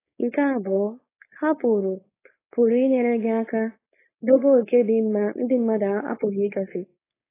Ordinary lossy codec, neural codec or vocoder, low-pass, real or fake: AAC, 16 kbps; codec, 16 kHz, 4.8 kbps, FACodec; 3.6 kHz; fake